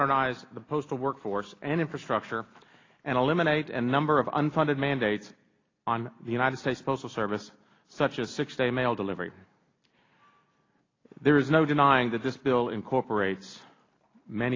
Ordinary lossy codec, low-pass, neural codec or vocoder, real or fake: AAC, 32 kbps; 7.2 kHz; none; real